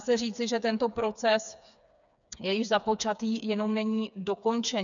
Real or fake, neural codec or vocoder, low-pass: fake; codec, 16 kHz, 4 kbps, FreqCodec, smaller model; 7.2 kHz